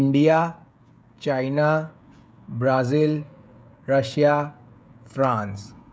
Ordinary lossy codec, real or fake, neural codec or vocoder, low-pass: none; fake; codec, 16 kHz, 16 kbps, FreqCodec, smaller model; none